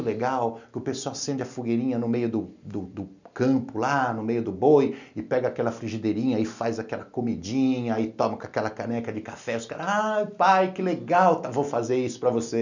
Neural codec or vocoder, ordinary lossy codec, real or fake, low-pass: none; none; real; 7.2 kHz